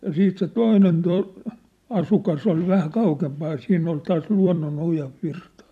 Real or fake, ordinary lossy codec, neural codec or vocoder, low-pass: fake; none; vocoder, 44.1 kHz, 128 mel bands every 256 samples, BigVGAN v2; 14.4 kHz